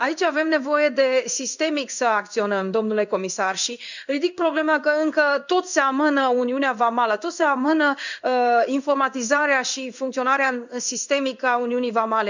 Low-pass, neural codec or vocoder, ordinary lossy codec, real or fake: 7.2 kHz; codec, 16 kHz in and 24 kHz out, 1 kbps, XY-Tokenizer; none; fake